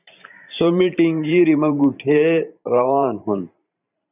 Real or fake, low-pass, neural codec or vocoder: fake; 3.6 kHz; vocoder, 44.1 kHz, 128 mel bands every 512 samples, BigVGAN v2